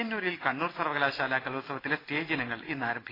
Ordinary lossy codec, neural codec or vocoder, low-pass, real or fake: AAC, 24 kbps; none; 5.4 kHz; real